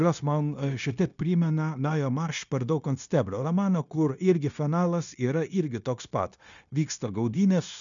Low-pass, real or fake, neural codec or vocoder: 7.2 kHz; fake; codec, 16 kHz, 0.9 kbps, LongCat-Audio-Codec